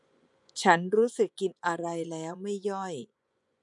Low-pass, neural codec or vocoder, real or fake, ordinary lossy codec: 10.8 kHz; vocoder, 24 kHz, 100 mel bands, Vocos; fake; none